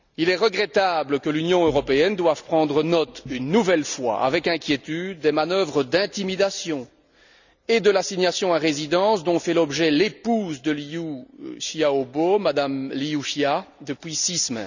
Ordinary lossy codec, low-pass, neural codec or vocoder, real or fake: none; 7.2 kHz; none; real